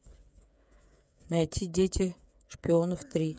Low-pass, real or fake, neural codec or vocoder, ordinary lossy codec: none; fake; codec, 16 kHz, 8 kbps, FreqCodec, smaller model; none